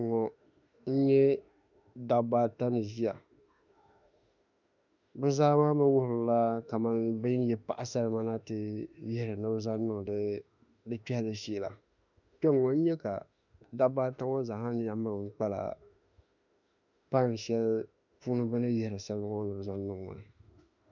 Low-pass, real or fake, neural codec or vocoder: 7.2 kHz; fake; autoencoder, 48 kHz, 32 numbers a frame, DAC-VAE, trained on Japanese speech